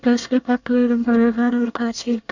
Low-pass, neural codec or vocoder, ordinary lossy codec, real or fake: 7.2 kHz; codec, 24 kHz, 1 kbps, SNAC; none; fake